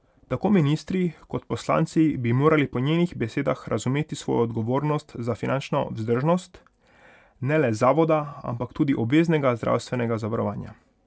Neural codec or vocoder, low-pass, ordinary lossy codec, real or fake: none; none; none; real